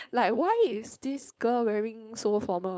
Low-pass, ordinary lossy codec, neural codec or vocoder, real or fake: none; none; codec, 16 kHz, 4.8 kbps, FACodec; fake